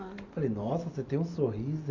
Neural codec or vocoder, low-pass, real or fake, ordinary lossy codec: none; 7.2 kHz; real; none